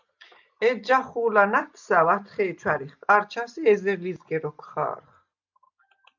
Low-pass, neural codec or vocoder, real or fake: 7.2 kHz; none; real